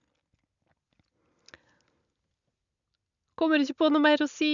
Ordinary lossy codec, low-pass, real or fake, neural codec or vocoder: none; 7.2 kHz; real; none